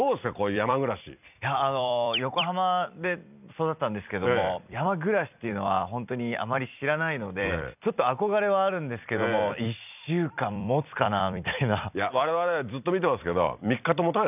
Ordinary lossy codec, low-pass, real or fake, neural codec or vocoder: none; 3.6 kHz; fake; vocoder, 44.1 kHz, 128 mel bands every 256 samples, BigVGAN v2